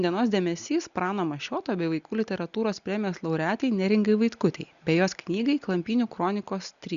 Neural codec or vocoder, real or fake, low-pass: none; real; 7.2 kHz